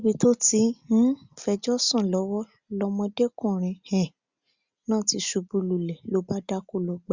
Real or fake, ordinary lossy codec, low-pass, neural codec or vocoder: real; Opus, 64 kbps; 7.2 kHz; none